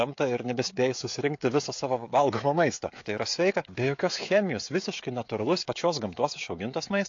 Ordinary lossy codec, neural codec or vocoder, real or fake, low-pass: AAC, 48 kbps; codec, 16 kHz, 16 kbps, FreqCodec, smaller model; fake; 7.2 kHz